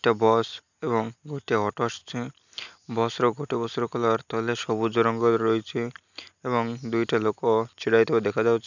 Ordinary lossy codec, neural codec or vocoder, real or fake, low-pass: none; none; real; 7.2 kHz